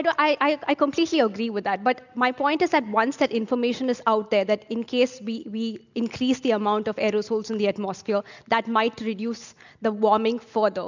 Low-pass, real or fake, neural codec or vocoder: 7.2 kHz; real; none